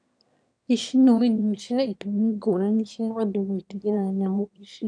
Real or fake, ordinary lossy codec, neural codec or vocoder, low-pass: fake; none; autoencoder, 22.05 kHz, a latent of 192 numbers a frame, VITS, trained on one speaker; 9.9 kHz